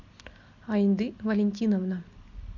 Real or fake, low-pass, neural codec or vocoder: real; 7.2 kHz; none